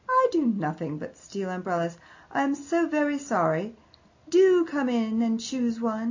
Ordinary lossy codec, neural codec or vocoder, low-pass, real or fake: AAC, 48 kbps; none; 7.2 kHz; real